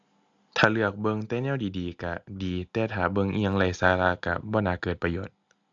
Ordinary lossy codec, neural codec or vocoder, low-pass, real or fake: AAC, 64 kbps; none; 7.2 kHz; real